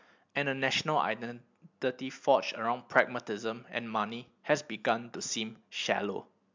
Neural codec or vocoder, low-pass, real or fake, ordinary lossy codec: none; 7.2 kHz; real; MP3, 64 kbps